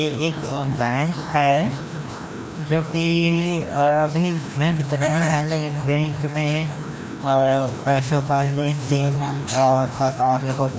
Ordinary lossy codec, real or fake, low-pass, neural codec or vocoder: none; fake; none; codec, 16 kHz, 1 kbps, FreqCodec, larger model